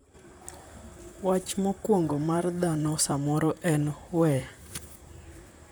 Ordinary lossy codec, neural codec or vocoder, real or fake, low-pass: none; vocoder, 44.1 kHz, 128 mel bands, Pupu-Vocoder; fake; none